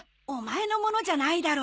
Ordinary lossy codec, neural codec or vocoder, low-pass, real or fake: none; none; none; real